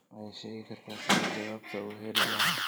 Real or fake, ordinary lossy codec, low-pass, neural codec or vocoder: fake; none; none; vocoder, 44.1 kHz, 128 mel bands every 512 samples, BigVGAN v2